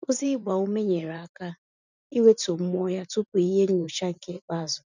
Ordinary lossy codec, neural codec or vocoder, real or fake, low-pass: none; vocoder, 44.1 kHz, 128 mel bands every 512 samples, BigVGAN v2; fake; 7.2 kHz